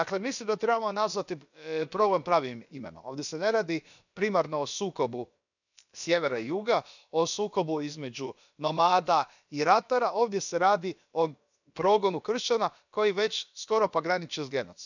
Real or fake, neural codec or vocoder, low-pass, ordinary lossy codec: fake; codec, 16 kHz, about 1 kbps, DyCAST, with the encoder's durations; 7.2 kHz; none